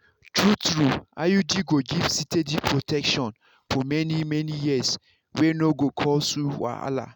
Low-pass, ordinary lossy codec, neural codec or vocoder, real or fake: none; none; none; real